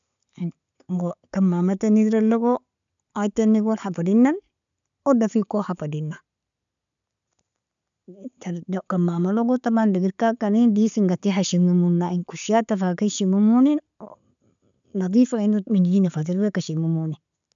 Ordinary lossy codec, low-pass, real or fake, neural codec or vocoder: none; 7.2 kHz; real; none